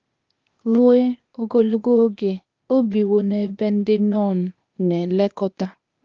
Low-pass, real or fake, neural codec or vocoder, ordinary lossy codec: 7.2 kHz; fake; codec, 16 kHz, 0.8 kbps, ZipCodec; Opus, 32 kbps